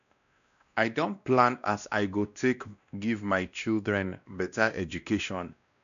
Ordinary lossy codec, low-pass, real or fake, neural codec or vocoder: none; 7.2 kHz; fake; codec, 16 kHz, 1 kbps, X-Codec, WavLM features, trained on Multilingual LibriSpeech